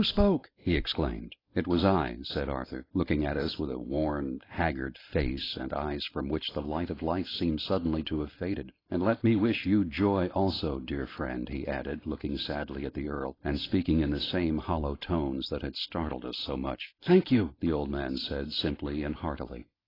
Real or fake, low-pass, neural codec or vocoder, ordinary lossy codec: real; 5.4 kHz; none; AAC, 24 kbps